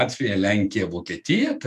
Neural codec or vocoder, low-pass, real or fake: autoencoder, 48 kHz, 128 numbers a frame, DAC-VAE, trained on Japanese speech; 14.4 kHz; fake